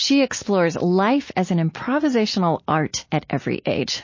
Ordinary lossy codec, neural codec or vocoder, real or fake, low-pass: MP3, 32 kbps; none; real; 7.2 kHz